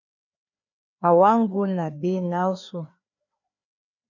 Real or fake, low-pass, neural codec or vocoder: fake; 7.2 kHz; codec, 16 kHz, 2 kbps, FreqCodec, larger model